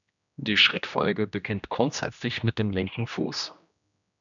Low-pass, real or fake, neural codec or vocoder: 7.2 kHz; fake; codec, 16 kHz, 1 kbps, X-Codec, HuBERT features, trained on general audio